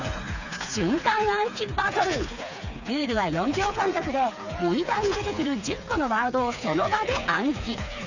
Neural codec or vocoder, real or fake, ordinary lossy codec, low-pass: codec, 16 kHz, 4 kbps, FreqCodec, smaller model; fake; none; 7.2 kHz